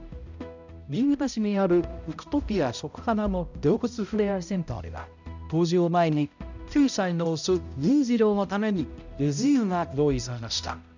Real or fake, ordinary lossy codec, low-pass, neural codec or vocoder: fake; none; 7.2 kHz; codec, 16 kHz, 0.5 kbps, X-Codec, HuBERT features, trained on balanced general audio